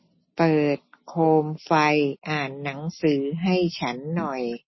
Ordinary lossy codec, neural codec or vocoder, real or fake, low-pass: MP3, 24 kbps; none; real; 7.2 kHz